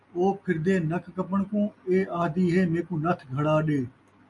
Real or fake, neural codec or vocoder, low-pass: real; none; 10.8 kHz